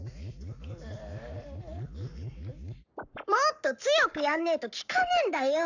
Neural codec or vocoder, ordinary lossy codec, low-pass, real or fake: codec, 44.1 kHz, 7.8 kbps, Pupu-Codec; none; 7.2 kHz; fake